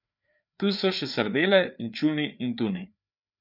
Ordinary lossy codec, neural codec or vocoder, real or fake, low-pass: none; codec, 16 kHz, 4 kbps, FreqCodec, larger model; fake; 5.4 kHz